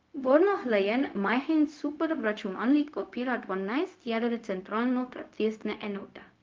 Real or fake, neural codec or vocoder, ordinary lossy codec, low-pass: fake; codec, 16 kHz, 0.4 kbps, LongCat-Audio-Codec; Opus, 32 kbps; 7.2 kHz